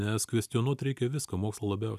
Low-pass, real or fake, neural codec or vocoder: 14.4 kHz; real; none